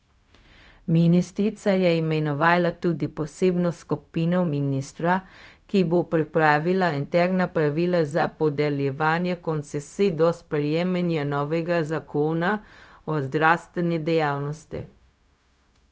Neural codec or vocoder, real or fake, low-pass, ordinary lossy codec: codec, 16 kHz, 0.4 kbps, LongCat-Audio-Codec; fake; none; none